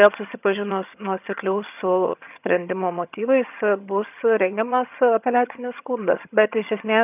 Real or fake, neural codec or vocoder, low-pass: fake; vocoder, 22.05 kHz, 80 mel bands, HiFi-GAN; 3.6 kHz